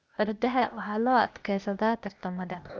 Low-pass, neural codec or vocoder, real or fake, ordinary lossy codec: none; codec, 16 kHz, 0.8 kbps, ZipCodec; fake; none